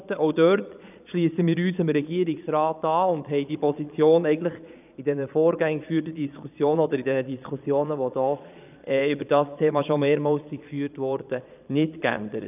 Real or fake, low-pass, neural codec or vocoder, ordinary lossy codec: fake; 3.6 kHz; vocoder, 22.05 kHz, 80 mel bands, Vocos; none